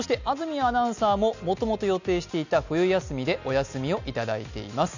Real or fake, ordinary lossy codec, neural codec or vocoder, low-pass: real; none; none; 7.2 kHz